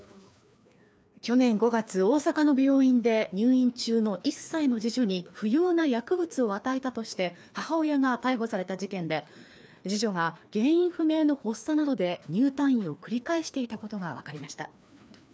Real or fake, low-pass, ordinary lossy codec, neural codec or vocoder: fake; none; none; codec, 16 kHz, 2 kbps, FreqCodec, larger model